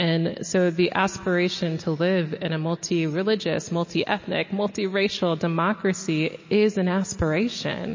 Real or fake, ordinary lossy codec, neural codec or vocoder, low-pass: real; MP3, 32 kbps; none; 7.2 kHz